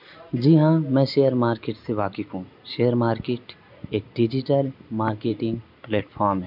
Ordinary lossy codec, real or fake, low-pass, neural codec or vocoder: none; real; 5.4 kHz; none